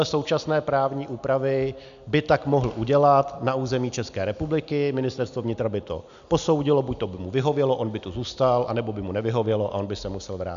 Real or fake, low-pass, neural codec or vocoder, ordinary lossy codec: real; 7.2 kHz; none; AAC, 96 kbps